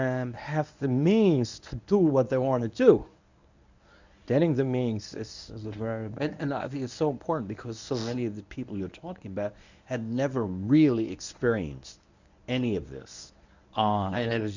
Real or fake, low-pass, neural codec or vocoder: fake; 7.2 kHz; codec, 24 kHz, 0.9 kbps, WavTokenizer, medium speech release version 1